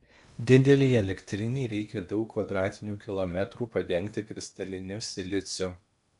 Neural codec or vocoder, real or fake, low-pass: codec, 16 kHz in and 24 kHz out, 0.8 kbps, FocalCodec, streaming, 65536 codes; fake; 10.8 kHz